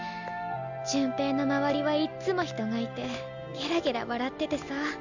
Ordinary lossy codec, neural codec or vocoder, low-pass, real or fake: none; none; 7.2 kHz; real